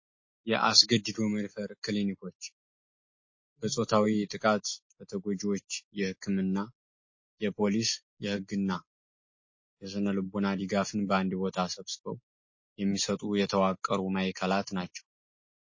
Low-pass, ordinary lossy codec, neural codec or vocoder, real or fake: 7.2 kHz; MP3, 32 kbps; none; real